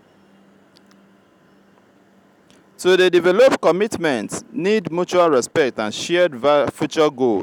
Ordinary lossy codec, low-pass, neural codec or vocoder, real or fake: none; 19.8 kHz; none; real